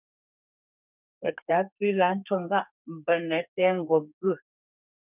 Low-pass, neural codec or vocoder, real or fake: 3.6 kHz; codec, 32 kHz, 1.9 kbps, SNAC; fake